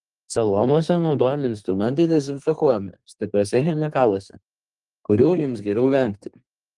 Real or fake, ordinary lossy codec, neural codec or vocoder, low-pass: fake; Opus, 24 kbps; codec, 32 kHz, 1.9 kbps, SNAC; 10.8 kHz